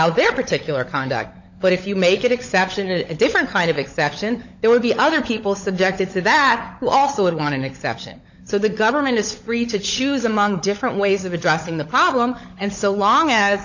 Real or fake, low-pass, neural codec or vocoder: fake; 7.2 kHz; codec, 16 kHz, 16 kbps, FunCodec, trained on LibriTTS, 50 frames a second